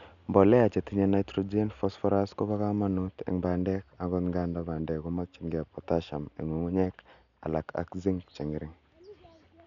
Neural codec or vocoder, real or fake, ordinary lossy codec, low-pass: none; real; none; 7.2 kHz